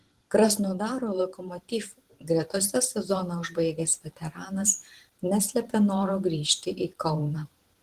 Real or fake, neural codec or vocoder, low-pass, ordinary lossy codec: fake; vocoder, 44.1 kHz, 128 mel bands every 512 samples, BigVGAN v2; 14.4 kHz; Opus, 16 kbps